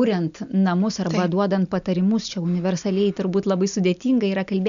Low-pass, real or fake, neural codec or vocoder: 7.2 kHz; real; none